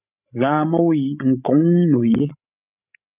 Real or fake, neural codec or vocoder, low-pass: fake; codec, 16 kHz, 16 kbps, FreqCodec, larger model; 3.6 kHz